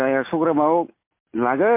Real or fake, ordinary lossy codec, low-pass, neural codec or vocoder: real; MP3, 24 kbps; 3.6 kHz; none